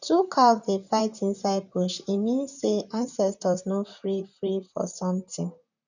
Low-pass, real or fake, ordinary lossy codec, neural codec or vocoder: 7.2 kHz; fake; none; vocoder, 44.1 kHz, 128 mel bands, Pupu-Vocoder